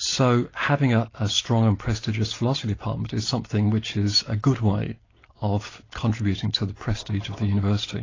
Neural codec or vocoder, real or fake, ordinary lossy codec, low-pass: none; real; AAC, 32 kbps; 7.2 kHz